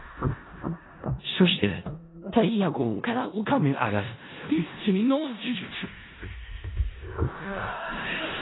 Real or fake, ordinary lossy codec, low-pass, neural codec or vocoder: fake; AAC, 16 kbps; 7.2 kHz; codec, 16 kHz in and 24 kHz out, 0.4 kbps, LongCat-Audio-Codec, four codebook decoder